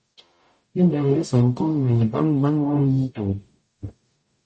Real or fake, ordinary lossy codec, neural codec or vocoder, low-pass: fake; MP3, 32 kbps; codec, 44.1 kHz, 0.9 kbps, DAC; 10.8 kHz